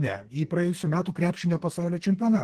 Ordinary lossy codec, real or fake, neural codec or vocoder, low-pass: Opus, 16 kbps; fake; codec, 32 kHz, 1.9 kbps, SNAC; 14.4 kHz